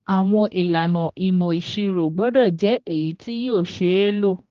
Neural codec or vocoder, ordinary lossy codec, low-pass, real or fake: codec, 16 kHz, 1 kbps, X-Codec, HuBERT features, trained on general audio; Opus, 32 kbps; 7.2 kHz; fake